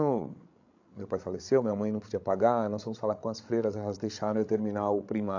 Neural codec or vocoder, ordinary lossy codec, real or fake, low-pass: codec, 16 kHz, 16 kbps, FreqCodec, larger model; AAC, 48 kbps; fake; 7.2 kHz